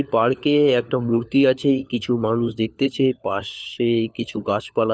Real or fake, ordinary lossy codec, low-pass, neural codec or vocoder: fake; none; none; codec, 16 kHz, 4 kbps, FunCodec, trained on LibriTTS, 50 frames a second